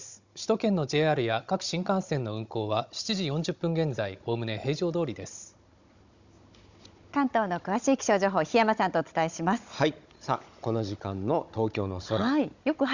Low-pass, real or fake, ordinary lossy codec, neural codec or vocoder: 7.2 kHz; fake; Opus, 64 kbps; codec, 16 kHz, 16 kbps, FunCodec, trained on Chinese and English, 50 frames a second